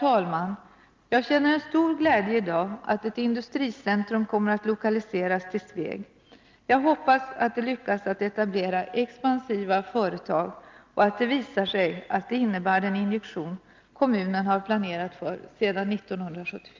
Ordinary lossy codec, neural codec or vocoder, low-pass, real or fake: Opus, 24 kbps; none; 7.2 kHz; real